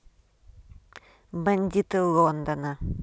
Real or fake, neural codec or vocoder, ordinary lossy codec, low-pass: real; none; none; none